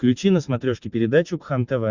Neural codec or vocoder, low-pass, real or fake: none; 7.2 kHz; real